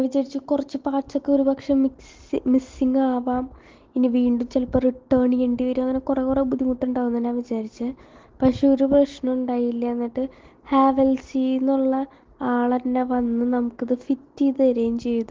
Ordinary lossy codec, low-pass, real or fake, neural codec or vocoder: Opus, 16 kbps; 7.2 kHz; real; none